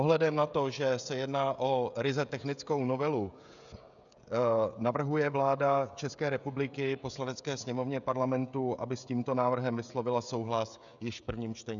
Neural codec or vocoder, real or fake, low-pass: codec, 16 kHz, 16 kbps, FreqCodec, smaller model; fake; 7.2 kHz